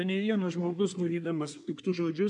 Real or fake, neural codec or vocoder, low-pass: fake; codec, 24 kHz, 1 kbps, SNAC; 10.8 kHz